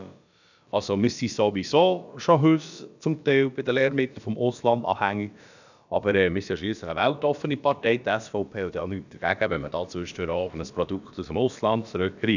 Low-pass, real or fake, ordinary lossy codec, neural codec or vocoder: 7.2 kHz; fake; none; codec, 16 kHz, about 1 kbps, DyCAST, with the encoder's durations